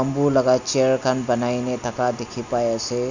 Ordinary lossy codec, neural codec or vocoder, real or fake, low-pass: none; none; real; 7.2 kHz